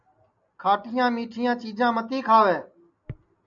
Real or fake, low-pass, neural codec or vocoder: real; 7.2 kHz; none